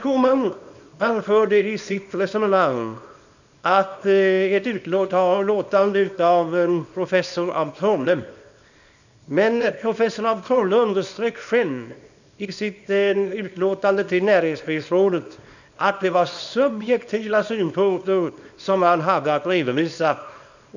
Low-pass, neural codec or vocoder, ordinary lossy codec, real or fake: 7.2 kHz; codec, 24 kHz, 0.9 kbps, WavTokenizer, small release; none; fake